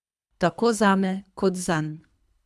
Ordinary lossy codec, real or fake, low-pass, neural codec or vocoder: none; fake; none; codec, 24 kHz, 3 kbps, HILCodec